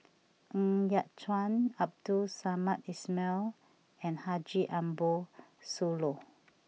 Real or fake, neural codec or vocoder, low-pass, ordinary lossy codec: real; none; none; none